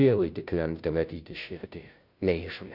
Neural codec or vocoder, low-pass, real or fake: codec, 16 kHz, 0.5 kbps, FunCodec, trained on Chinese and English, 25 frames a second; 5.4 kHz; fake